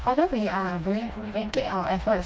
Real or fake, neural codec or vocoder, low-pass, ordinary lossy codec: fake; codec, 16 kHz, 1 kbps, FreqCodec, smaller model; none; none